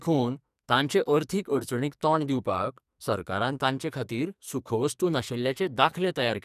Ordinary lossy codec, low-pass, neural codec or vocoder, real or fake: none; 14.4 kHz; codec, 44.1 kHz, 2.6 kbps, SNAC; fake